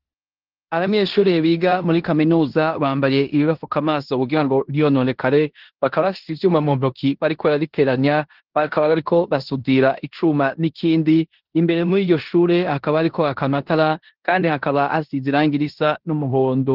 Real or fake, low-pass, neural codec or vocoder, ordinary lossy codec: fake; 5.4 kHz; codec, 16 kHz in and 24 kHz out, 0.9 kbps, LongCat-Audio-Codec, four codebook decoder; Opus, 16 kbps